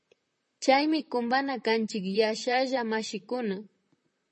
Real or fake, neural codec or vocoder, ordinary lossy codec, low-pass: fake; vocoder, 44.1 kHz, 128 mel bands, Pupu-Vocoder; MP3, 32 kbps; 10.8 kHz